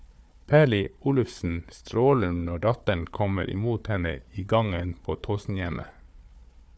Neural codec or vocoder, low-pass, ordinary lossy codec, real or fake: codec, 16 kHz, 4 kbps, FunCodec, trained on Chinese and English, 50 frames a second; none; none; fake